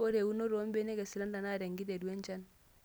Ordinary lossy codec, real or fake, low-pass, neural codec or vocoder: none; real; none; none